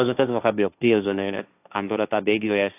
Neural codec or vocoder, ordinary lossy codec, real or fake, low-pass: codec, 16 kHz, 1.1 kbps, Voila-Tokenizer; AAC, 32 kbps; fake; 3.6 kHz